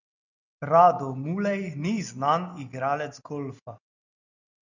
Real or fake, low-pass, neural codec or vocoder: real; 7.2 kHz; none